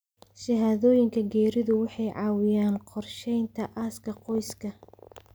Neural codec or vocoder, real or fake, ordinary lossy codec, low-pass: none; real; none; none